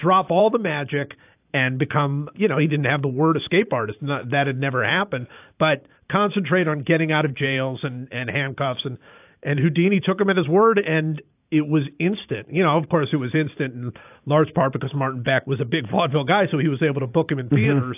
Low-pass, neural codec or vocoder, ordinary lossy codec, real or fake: 3.6 kHz; vocoder, 44.1 kHz, 128 mel bands every 512 samples, BigVGAN v2; AAC, 32 kbps; fake